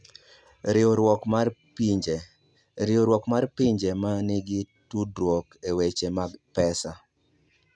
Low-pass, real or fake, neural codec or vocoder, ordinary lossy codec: none; real; none; none